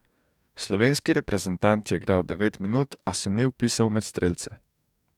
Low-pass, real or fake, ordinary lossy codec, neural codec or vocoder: 19.8 kHz; fake; none; codec, 44.1 kHz, 2.6 kbps, DAC